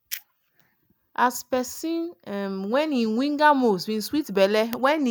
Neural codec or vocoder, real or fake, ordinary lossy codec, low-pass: none; real; none; none